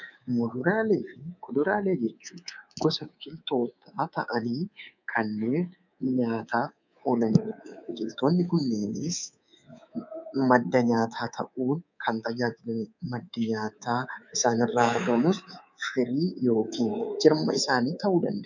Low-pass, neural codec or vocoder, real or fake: 7.2 kHz; codec, 24 kHz, 3.1 kbps, DualCodec; fake